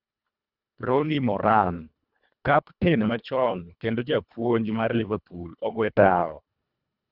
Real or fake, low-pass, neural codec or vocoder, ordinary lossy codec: fake; 5.4 kHz; codec, 24 kHz, 1.5 kbps, HILCodec; Opus, 64 kbps